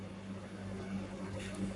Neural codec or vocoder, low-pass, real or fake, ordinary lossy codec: codec, 44.1 kHz, 7.8 kbps, Pupu-Codec; 10.8 kHz; fake; MP3, 96 kbps